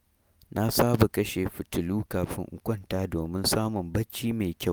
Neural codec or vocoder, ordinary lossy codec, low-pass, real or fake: none; none; none; real